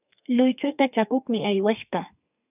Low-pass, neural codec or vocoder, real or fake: 3.6 kHz; codec, 32 kHz, 1.9 kbps, SNAC; fake